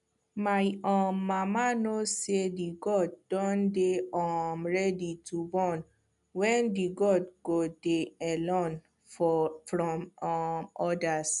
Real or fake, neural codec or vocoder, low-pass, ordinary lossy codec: real; none; 10.8 kHz; none